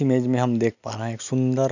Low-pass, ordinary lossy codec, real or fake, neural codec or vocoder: 7.2 kHz; none; real; none